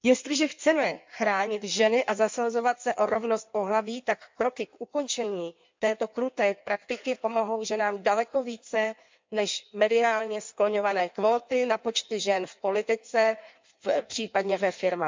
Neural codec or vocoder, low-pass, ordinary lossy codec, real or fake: codec, 16 kHz in and 24 kHz out, 1.1 kbps, FireRedTTS-2 codec; 7.2 kHz; MP3, 64 kbps; fake